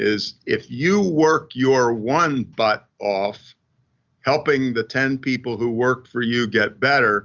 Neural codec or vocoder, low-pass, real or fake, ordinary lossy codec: none; 7.2 kHz; real; Opus, 64 kbps